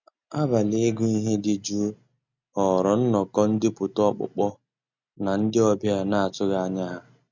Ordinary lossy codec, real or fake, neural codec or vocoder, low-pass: MP3, 64 kbps; real; none; 7.2 kHz